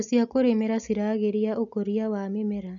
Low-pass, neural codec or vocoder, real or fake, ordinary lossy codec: 7.2 kHz; none; real; none